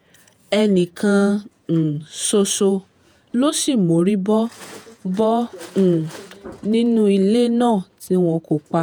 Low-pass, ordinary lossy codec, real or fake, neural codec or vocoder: none; none; fake; vocoder, 48 kHz, 128 mel bands, Vocos